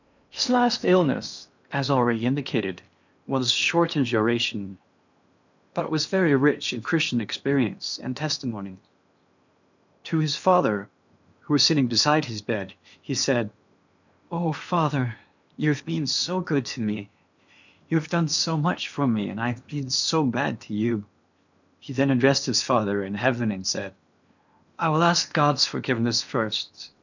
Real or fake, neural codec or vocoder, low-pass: fake; codec, 16 kHz in and 24 kHz out, 0.8 kbps, FocalCodec, streaming, 65536 codes; 7.2 kHz